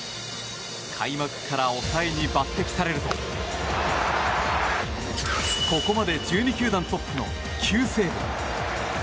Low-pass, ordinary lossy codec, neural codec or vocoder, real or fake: none; none; none; real